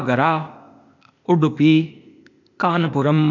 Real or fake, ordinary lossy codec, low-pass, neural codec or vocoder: fake; none; 7.2 kHz; codec, 16 kHz, 0.8 kbps, ZipCodec